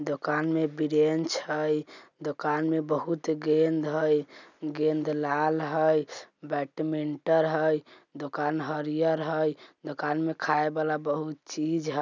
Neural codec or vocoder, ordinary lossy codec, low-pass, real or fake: none; none; 7.2 kHz; real